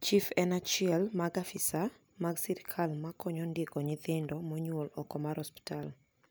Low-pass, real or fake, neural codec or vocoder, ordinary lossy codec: none; real; none; none